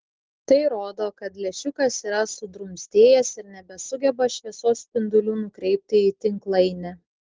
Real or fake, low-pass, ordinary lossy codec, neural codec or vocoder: real; 7.2 kHz; Opus, 16 kbps; none